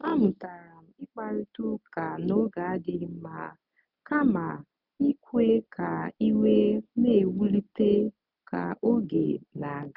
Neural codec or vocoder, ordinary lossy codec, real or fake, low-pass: none; none; real; 5.4 kHz